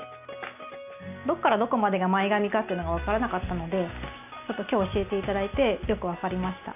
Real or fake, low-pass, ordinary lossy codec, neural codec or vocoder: real; 3.6 kHz; none; none